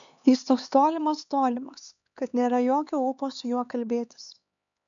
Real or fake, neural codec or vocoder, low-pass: fake; codec, 16 kHz, 4 kbps, X-Codec, HuBERT features, trained on LibriSpeech; 7.2 kHz